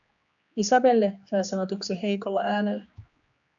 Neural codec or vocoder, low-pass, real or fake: codec, 16 kHz, 2 kbps, X-Codec, HuBERT features, trained on general audio; 7.2 kHz; fake